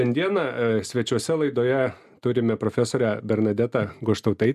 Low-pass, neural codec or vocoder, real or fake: 14.4 kHz; none; real